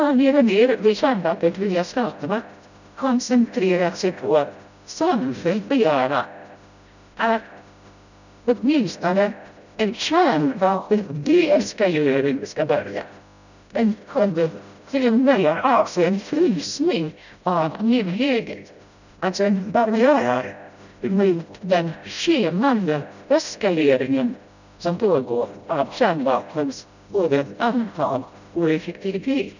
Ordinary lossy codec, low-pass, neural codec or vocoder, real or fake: none; 7.2 kHz; codec, 16 kHz, 0.5 kbps, FreqCodec, smaller model; fake